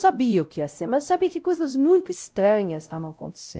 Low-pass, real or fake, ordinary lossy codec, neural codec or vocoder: none; fake; none; codec, 16 kHz, 0.5 kbps, X-Codec, WavLM features, trained on Multilingual LibriSpeech